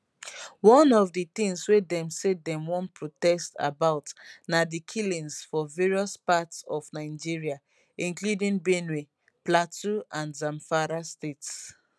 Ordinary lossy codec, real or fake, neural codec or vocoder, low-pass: none; real; none; none